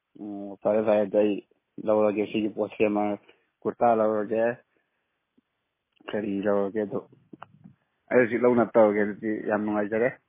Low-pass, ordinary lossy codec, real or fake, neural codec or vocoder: 3.6 kHz; MP3, 16 kbps; real; none